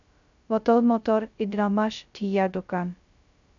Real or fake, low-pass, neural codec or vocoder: fake; 7.2 kHz; codec, 16 kHz, 0.2 kbps, FocalCodec